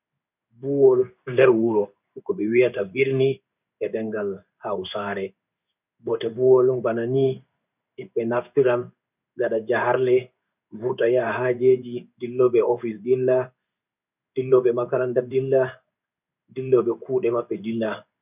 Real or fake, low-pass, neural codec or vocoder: fake; 3.6 kHz; codec, 16 kHz in and 24 kHz out, 1 kbps, XY-Tokenizer